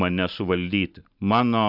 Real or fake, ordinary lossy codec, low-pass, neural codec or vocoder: real; Opus, 64 kbps; 5.4 kHz; none